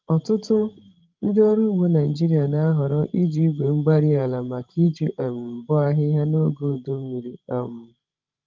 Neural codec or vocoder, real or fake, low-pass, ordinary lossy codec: codec, 16 kHz, 16 kbps, FreqCodec, larger model; fake; 7.2 kHz; Opus, 32 kbps